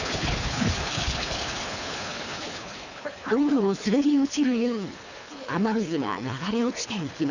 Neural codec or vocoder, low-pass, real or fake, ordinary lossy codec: codec, 24 kHz, 3 kbps, HILCodec; 7.2 kHz; fake; none